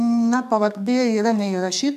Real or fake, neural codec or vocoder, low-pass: fake; codec, 32 kHz, 1.9 kbps, SNAC; 14.4 kHz